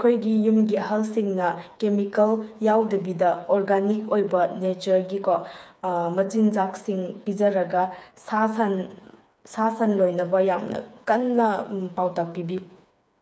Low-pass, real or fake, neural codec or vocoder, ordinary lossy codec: none; fake; codec, 16 kHz, 4 kbps, FreqCodec, smaller model; none